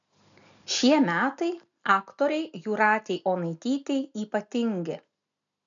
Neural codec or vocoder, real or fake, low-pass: none; real; 7.2 kHz